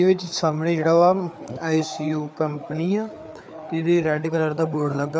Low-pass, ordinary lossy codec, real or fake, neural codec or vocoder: none; none; fake; codec, 16 kHz, 4 kbps, FreqCodec, larger model